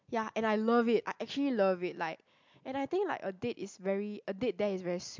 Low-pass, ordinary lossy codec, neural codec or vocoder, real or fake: 7.2 kHz; MP3, 64 kbps; none; real